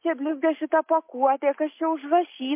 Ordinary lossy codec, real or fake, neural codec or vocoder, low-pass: MP3, 24 kbps; real; none; 3.6 kHz